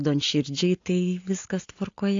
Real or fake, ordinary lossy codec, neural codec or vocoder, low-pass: real; AAC, 48 kbps; none; 7.2 kHz